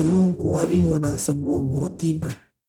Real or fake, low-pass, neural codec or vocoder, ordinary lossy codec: fake; none; codec, 44.1 kHz, 0.9 kbps, DAC; none